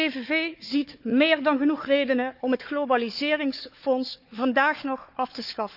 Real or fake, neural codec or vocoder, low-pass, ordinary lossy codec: fake; codec, 16 kHz, 4 kbps, FunCodec, trained on Chinese and English, 50 frames a second; 5.4 kHz; none